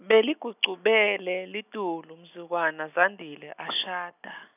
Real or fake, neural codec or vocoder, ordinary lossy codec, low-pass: real; none; none; 3.6 kHz